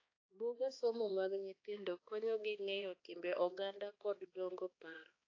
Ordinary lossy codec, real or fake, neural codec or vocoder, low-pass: AAC, 32 kbps; fake; codec, 16 kHz, 2 kbps, X-Codec, HuBERT features, trained on balanced general audio; 7.2 kHz